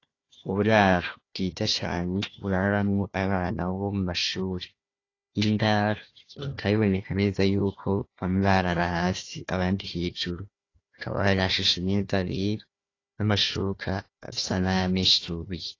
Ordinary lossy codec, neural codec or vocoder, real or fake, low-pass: AAC, 32 kbps; codec, 16 kHz, 1 kbps, FunCodec, trained on Chinese and English, 50 frames a second; fake; 7.2 kHz